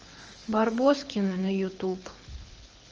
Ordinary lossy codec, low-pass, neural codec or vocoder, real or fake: Opus, 24 kbps; 7.2 kHz; codec, 24 kHz, 6 kbps, HILCodec; fake